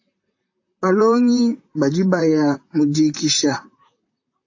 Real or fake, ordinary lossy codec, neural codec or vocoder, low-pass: fake; AAC, 48 kbps; vocoder, 44.1 kHz, 128 mel bands, Pupu-Vocoder; 7.2 kHz